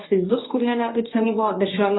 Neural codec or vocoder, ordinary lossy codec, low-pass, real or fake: codec, 24 kHz, 0.9 kbps, WavTokenizer, medium speech release version 1; AAC, 16 kbps; 7.2 kHz; fake